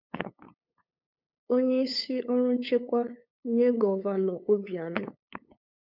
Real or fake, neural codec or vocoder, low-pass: fake; codec, 16 kHz, 8 kbps, FunCodec, trained on LibriTTS, 25 frames a second; 5.4 kHz